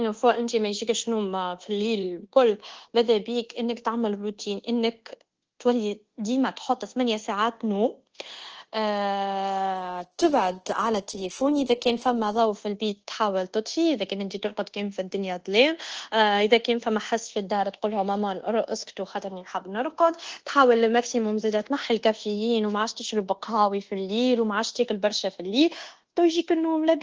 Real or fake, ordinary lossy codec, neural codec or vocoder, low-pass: fake; Opus, 16 kbps; codec, 24 kHz, 1.2 kbps, DualCodec; 7.2 kHz